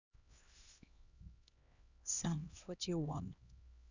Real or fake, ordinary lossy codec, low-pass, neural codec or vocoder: fake; Opus, 64 kbps; 7.2 kHz; codec, 16 kHz, 1 kbps, X-Codec, HuBERT features, trained on LibriSpeech